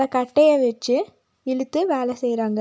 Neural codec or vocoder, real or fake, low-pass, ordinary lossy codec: none; real; none; none